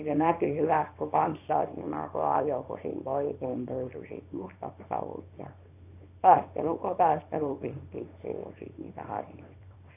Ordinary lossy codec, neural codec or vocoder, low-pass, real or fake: AAC, 32 kbps; codec, 24 kHz, 0.9 kbps, WavTokenizer, small release; 3.6 kHz; fake